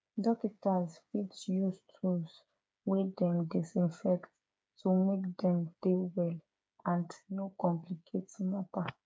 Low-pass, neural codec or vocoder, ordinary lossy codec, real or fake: none; codec, 16 kHz, 8 kbps, FreqCodec, smaller model; none; fake